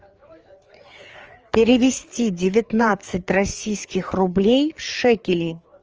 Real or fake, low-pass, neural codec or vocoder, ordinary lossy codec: fake; 7.2 kHz; codec, 16 kHz, 4 kbps, FreqCodec, larger model; Opus, 24 kbps